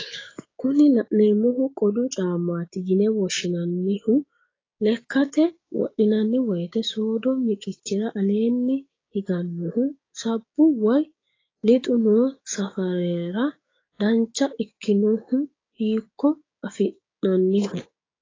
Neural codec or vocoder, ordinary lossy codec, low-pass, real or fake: codec, 16 kHz, 6 kbps, DAC; AAC, 32 kbps; 7.2 kHz; fake